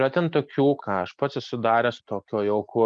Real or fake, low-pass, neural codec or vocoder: real; 9.9 kHz; none